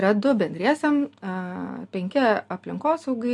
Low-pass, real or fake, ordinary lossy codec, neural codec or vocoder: 10.8 kHz; real; MP3, 64 kbps; none